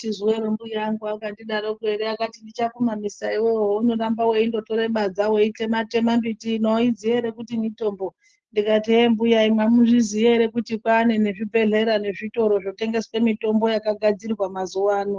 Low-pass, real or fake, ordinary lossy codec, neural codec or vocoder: 7.2 kHz; real; Opus, 16 kbps; none